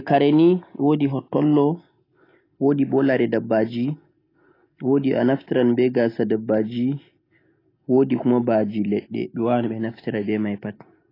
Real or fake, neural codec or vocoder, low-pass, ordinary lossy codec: real; none; 5.4 kHz; AAC, 24 kbps